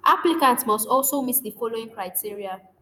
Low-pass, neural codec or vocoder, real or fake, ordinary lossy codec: none; vocoder, 48 kHz, 128 mel bands, Vocos; fake; none